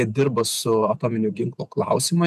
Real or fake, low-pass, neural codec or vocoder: fake; 14.4 kHz; vocoder, 48 kHz, 128 mel bands, Vocos